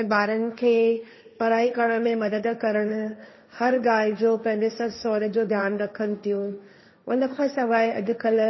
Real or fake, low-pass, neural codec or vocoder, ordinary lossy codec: fake; 7.2 kHz; codec, 16 kHz, 1.1 kbps, Voila-Tokenizer; MP3, 24 kbps